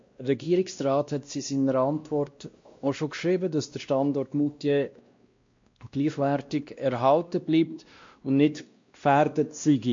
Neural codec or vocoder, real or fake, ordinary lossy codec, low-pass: codec, 16 kHz, 1 kbps, X-Codec, WavLM features, trained on Multilingual LibriSpeech; fake; MP3, 48 kbps; 7.2 kHz